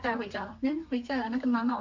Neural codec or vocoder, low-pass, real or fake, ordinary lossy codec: codec, 32 kHz, 1.9 kbps, SNAC; 7.2 kHz; fake; MP3, 48 kbps